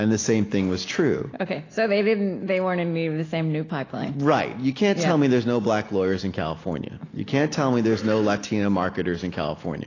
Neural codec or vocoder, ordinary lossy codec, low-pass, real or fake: none; AAC, 32 kbps; 7.2 kHz; real